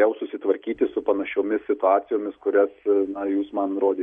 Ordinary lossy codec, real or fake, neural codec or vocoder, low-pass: MP3, 48 kbps; real; none; 9.9 kHz